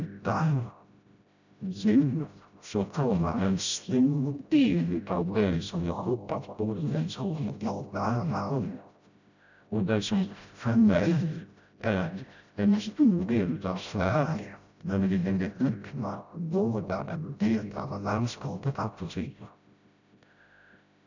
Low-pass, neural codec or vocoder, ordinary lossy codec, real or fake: 7.2 kHz; codec, 16 kHz, 0.5 kbps, FreqCodec, smaller model; none; fake